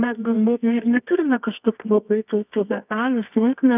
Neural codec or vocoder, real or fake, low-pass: codec, 24 kHz, 0.9 kbps, WavTokenizer, medium music audio release; fake; 3.6 kHz